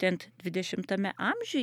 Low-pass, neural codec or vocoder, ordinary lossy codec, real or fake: 19.8 kHz; none; MP3, 96 kbps; real